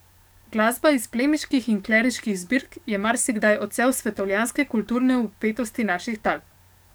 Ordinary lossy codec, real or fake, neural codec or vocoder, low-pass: none; fake; codec, 44.1 kHz, 7.8 kbps, DAC; none